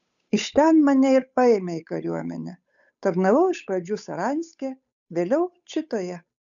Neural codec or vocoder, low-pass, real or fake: codec, 16 kHz, 8 kbps, FunCodec, trained on Chinese and English, 25 frames a second; 7.2 kHz; fake